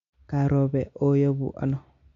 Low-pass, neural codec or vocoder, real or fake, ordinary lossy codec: 7.2 kHz; none; real; MP3, 64 kbps